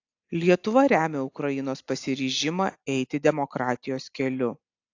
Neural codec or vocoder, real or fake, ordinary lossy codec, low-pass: none; real; AAC, 48 kbps; 7.2 kHz